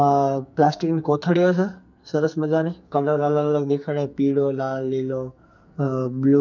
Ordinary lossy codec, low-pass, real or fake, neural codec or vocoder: none; 7.2 kHz; fake; codec, 44.1 kHz, 2.6 kbps, SNAC